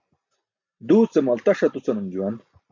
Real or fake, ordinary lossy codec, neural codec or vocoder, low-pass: real; MP3, 64 kbps; none; 7.2 kHz